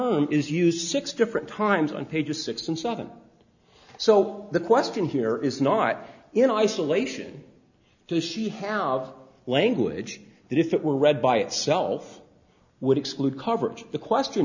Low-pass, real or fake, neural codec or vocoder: 7.2 kHz; real; none